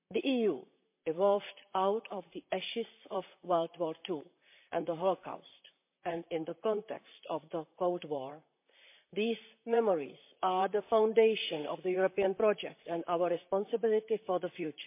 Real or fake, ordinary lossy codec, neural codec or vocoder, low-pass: fake; MP3, 24 kbps; vocoder, 44.1 kHz, 128 mel bands, Pupu-Vocoder; 3.6 kHz